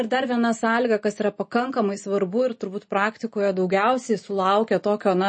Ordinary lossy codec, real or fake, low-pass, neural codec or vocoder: MP3, 32 kbps; real; 9.9 kHz; none